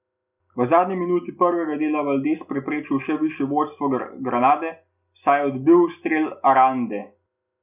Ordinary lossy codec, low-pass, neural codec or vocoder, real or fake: none; 3.6 kHz; none; real